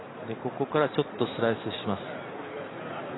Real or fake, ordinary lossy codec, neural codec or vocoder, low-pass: real; AAC, 16 kbps; none; 7.2 kHz